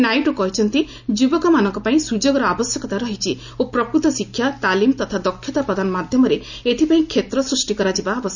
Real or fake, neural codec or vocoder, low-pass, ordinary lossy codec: real; none; 7.2 kHz; none